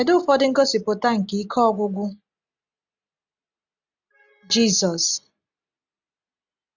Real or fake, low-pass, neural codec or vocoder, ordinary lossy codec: real; 7.2 kHz; none; none